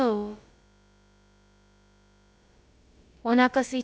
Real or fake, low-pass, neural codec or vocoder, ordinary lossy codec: fake; none; codec, 16 kHz, about 1 kbps, DyCAST, with the encoder's durations; none